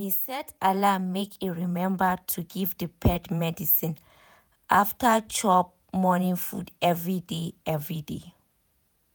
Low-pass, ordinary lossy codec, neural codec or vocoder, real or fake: none; none; vocoder, 48 kHz, 128 mel bands, Vocos; fake